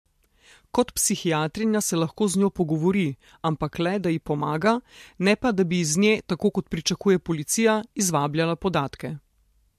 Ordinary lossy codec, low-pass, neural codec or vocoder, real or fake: MP3, 64 kbps; 14.4 kHz; none; real